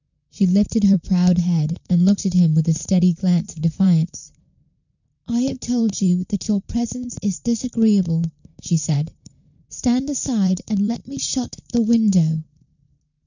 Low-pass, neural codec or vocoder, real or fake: 7.2 kHz; vocoder, 44.1 kHz, 128 mel bands, Pupu-Vocoder; fake